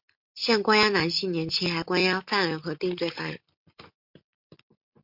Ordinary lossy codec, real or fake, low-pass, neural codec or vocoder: MP3, 48 kbps; real; 5.4 kHz; none